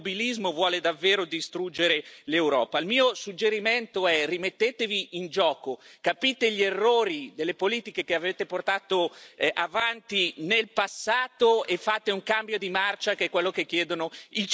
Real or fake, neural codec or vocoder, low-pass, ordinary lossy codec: real; none; none; none